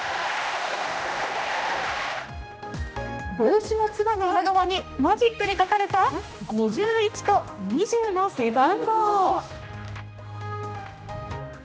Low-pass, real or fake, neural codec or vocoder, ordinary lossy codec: none; fake; codec, 16 kHz, 1 kbps, X-Codec, HuBERT features, trained on general audio; none